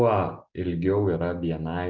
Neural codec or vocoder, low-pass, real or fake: none; 7.2 kHz; real